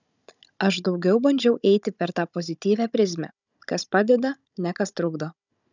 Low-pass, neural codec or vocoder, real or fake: 7.2 kHz; codec, 16 kHz, 16 kbps, FunCodec, trained on Chinese and English, 50 frames a second; fake